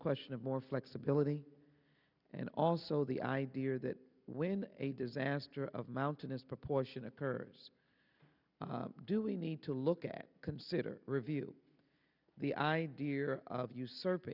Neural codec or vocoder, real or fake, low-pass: none; real; 5.4 kHz